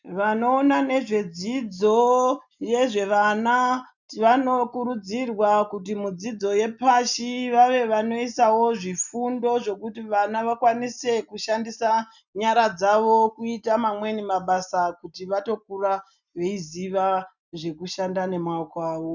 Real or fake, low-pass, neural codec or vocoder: real; 7.2 kHz; none